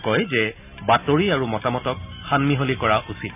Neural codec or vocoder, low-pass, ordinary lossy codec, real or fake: none; 3.6 kHz; none; real